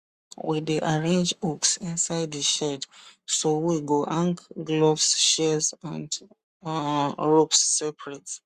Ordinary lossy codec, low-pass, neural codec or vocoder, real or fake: Opus, 64 kbps; 14.4 kHz; codec, 44.1 kHz, 7.8 kbps, Pupu-Codec; fake